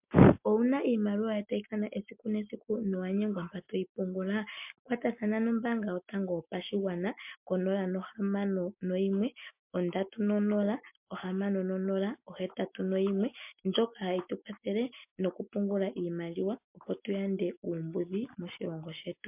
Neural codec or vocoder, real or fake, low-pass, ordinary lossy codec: none; real; 3.6 kHz; MP3, 32 kbps